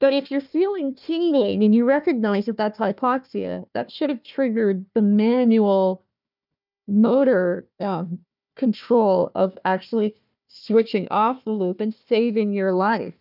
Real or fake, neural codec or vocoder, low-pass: fake; codec, 16 kHz, 1 kbps, FunCodec, trained on Chinese and English, 50 frames a second; 5.4 kHz